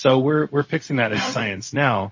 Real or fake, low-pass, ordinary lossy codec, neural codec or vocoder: fake; 7.2 kHz; MP3, 32 kbps; codec, 16 kHz, 0.4 kbps, LongCat-Audio-Codec